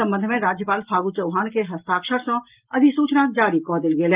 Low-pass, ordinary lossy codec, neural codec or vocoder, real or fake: 3.6 kHz; Opus, 32 kbps; none; real